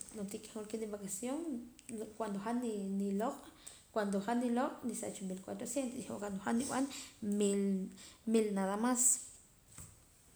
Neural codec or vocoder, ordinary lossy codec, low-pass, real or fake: none; none; none; real